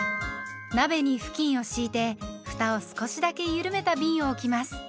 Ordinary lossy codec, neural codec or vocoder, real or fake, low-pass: none; none; real; none